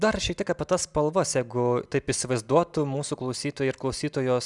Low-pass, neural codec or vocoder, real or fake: 10.8 kHz; none; real